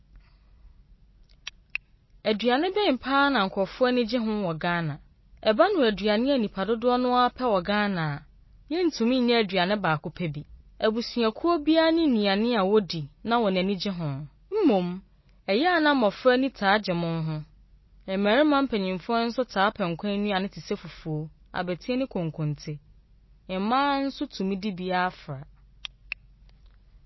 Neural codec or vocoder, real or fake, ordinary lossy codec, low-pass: none; real; MP3, 24 kbps; 7.2 kHz